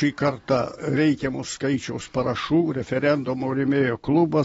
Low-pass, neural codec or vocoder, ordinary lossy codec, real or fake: 19.8 kHz; none; AAC, 24 kbps; real